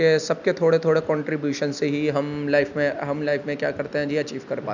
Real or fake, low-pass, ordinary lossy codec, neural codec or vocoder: real; 7.2 kHz; none; none